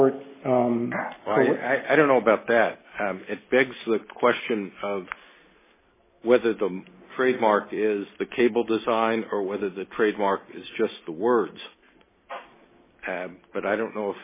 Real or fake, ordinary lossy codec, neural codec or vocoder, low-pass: real; MP3, 16 kbps; none; 3.6 kHz